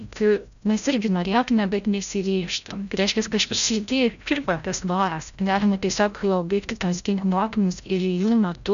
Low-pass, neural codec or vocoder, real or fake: 7.2 kHz; codec, 16 kHz, 0.5 kbps, FreqCodec, larger model; fake